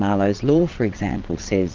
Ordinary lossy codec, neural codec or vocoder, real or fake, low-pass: Opus, 24 kbps; vocoder, 22.05 kHz, 80 mel bands, Vocos; fake; 7.2 kHz